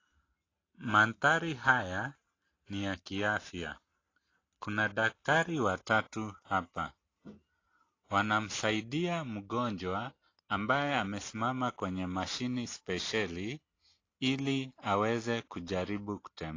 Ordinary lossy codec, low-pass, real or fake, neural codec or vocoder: AAC, 32 kbps; 7.2 kHz; real; none